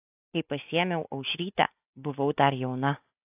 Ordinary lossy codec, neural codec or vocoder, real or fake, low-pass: AAC, 32 kbps; none; real; 3.6 kHz